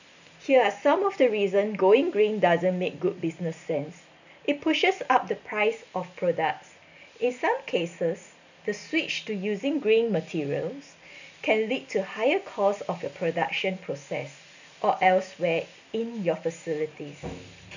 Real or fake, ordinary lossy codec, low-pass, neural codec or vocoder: real; none; 7.2 kHz; none